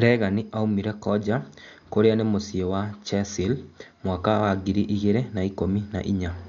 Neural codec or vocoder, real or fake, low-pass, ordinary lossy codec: none; real; 7.2 kHz; MP3, 64 kbps